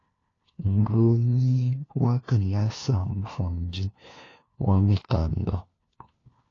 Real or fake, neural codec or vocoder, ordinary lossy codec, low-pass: fake; codec, 16 kHz, 1 kbps, FunCodec, trained on LibriTTS, 50 frames a second; AAC, 32 kbps; 7.2 kHz